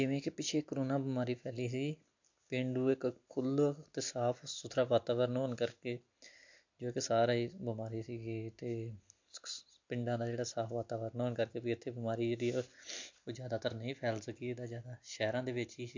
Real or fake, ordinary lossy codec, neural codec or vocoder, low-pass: real; MP3, 48 kbps; none; 7.2 kHz